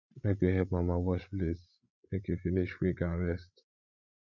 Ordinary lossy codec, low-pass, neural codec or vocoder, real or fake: none; 7.2 kHz; codec, 16 kHz, 16 kbps, FreqCodec, larger model; fake